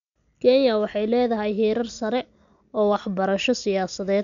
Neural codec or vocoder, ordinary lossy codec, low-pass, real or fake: none; MP3, 96 kbps; 7.2 kHz; real